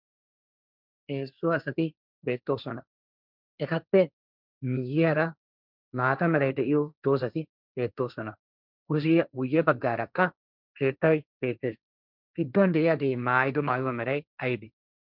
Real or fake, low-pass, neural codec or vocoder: fake; 5.4 kHz; codec, 16 kHz, 1.1 kbps, Voila-Tokenizer